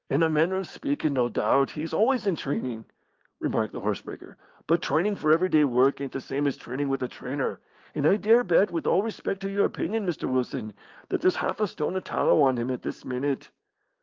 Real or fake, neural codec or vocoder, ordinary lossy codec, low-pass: fake; codec, 16 kHz, 6 kbps, DAC; Opus, 32 kbps; 7.2 kHz